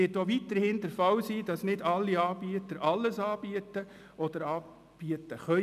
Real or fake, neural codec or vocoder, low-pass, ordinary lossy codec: real; none; 14.4 kHz; none